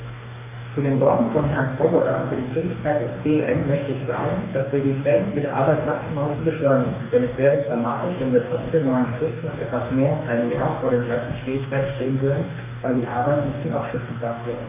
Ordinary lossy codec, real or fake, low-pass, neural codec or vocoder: none; fake; 3.6 kHz; codec, 44.1 kHz, 2.6 kbps, DAC